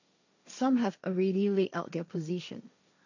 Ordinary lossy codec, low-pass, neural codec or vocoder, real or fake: none; 7.2 kHz; codec, 16 kHz, 1.1 kbps, Voila-Tokenizer; fake